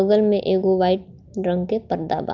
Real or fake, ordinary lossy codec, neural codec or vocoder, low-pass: real; Opus, 24 kbps; none; 7.2 kHz